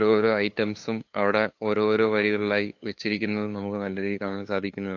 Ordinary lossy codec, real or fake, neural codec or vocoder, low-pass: AAC, 48 kbps; fake; codec, 16 kHz, 2 kbps, FunCodec, trained on LibriTTS, 25 frames a second; 7.2 kHz